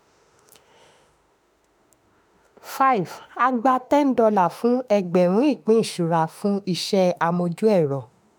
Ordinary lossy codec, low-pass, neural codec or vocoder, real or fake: none; none; autoencoder, 48 kHz, 32 numbers a frame, DAC-VAE, trained on Japanese speech; fake